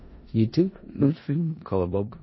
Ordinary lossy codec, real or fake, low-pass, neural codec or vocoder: MP3, 24 kbps; fake; 7.2 kHz; codec, 16 kHz in and 24 kHz out, 0.4 kbps, LongCat-Audio-Codec, four codebook decoder